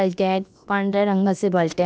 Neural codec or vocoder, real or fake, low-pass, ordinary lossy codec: codec, 16 kHz, about 1 kbps, DyCAST, with the encoder's durations; fake; none; none